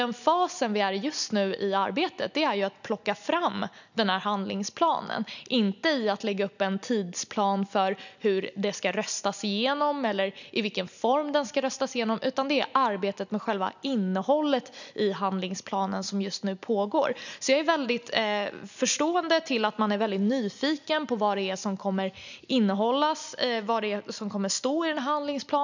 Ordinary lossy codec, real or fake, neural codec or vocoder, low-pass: none; real; none; 7.2 kHz